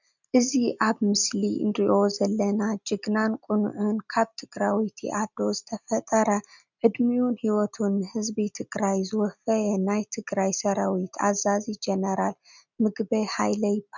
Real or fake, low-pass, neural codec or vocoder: real; 7.2 kHz; none